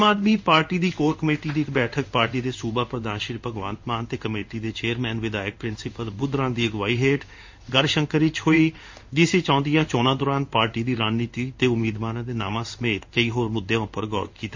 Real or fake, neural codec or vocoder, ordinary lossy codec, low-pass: fake; codec, 16 kHz in and 24 kHz out, 1 kbps, XY-Tokenizer; MP3, 48 kbps; 7.2 kHz